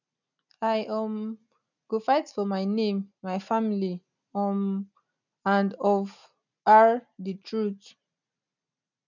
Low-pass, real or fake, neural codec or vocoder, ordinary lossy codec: 7.2 kHz; real; none; none